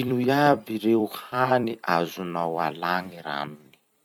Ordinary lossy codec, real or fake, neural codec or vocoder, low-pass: none; fake; vocoder, 44.1 kHz, 128 mel bands every 256 samples, BigVGAN v2; 19.8 kHz